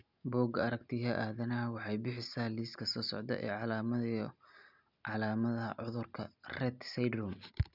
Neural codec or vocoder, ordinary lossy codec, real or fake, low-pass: none; none; real; 5.4 kHz